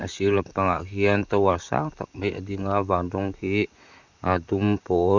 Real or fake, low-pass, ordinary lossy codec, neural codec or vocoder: fake; 7.2 kHz; none; vocoder, 44.1 kHz, 128 mel bands, Pupu-Vocoder